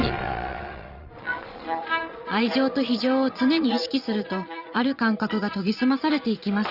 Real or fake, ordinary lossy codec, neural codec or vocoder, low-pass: fake; Opus, 64 kbps; vocoder, 22.05 kHz, 80 mel bands, Vocos; 5.4 kHz